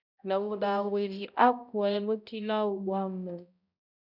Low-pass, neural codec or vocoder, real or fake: 5.4 kHz; codec, 16 kHz, 0.5 kbps, X-Codec, HuBERT features, trained on balanced general audio; fake